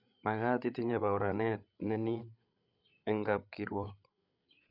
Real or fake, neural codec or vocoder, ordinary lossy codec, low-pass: fake; codec, 16 kHz, 8 kbps, FreqCodec, larger model; none; 5.4 kHz